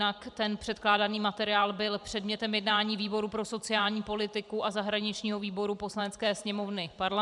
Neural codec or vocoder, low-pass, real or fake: vocoder, 44.1 kHz, 128 mel bands every 512 samples, BigVGAN v2; 10.8 kHz; fake